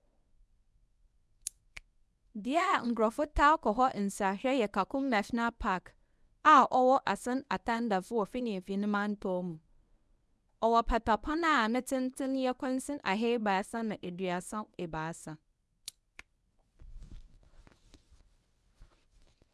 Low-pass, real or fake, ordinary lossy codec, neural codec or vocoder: none; fake; none; codec, 24 kHz, 0.9 kbps, WavTokenizer, medium speech release version 1